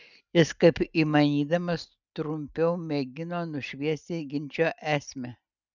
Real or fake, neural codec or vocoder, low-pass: real; none; 7.2 kHz